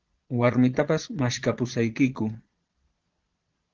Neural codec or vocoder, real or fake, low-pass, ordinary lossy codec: vocoder, 22.05 kHz, 80 mel bands, Vocos; fake; 7.2 kHz; Opus, 16 kbps